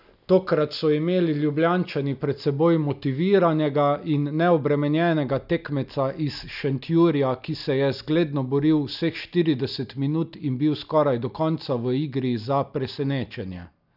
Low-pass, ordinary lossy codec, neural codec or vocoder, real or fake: 5.4 kHz; none; none; real